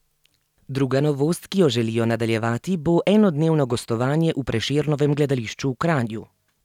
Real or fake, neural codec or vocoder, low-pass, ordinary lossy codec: real; none; 19.8 kHz; none